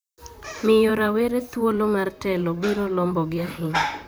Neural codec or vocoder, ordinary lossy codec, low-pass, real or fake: vocoder, 44.1 kHz, 128 mel bands, Pupu-Vocoder; none; none; fake